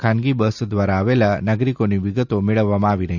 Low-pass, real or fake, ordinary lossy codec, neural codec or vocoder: 7.2 kHz; real; none; none